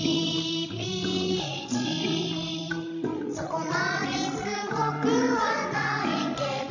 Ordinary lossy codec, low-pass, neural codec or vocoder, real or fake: none; 7.2 kHz; codec, 16 kHz, 16 kbps, FreqCodec, larger model; fake